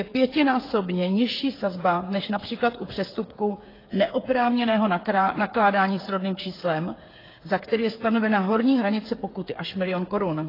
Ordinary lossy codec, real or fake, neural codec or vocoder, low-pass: AAC, 24 kbps; fake; codec, 16 kHz, 8 kbps, FreqCodec, smaller model; 5.4 kHz